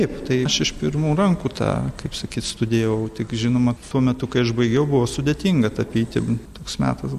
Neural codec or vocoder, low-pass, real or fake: none; 14.4 kHz; real